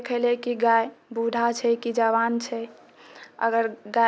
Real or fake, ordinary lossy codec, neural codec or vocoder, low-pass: real; none; none; none